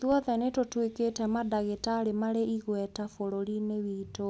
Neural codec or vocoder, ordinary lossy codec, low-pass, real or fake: none; none; none; real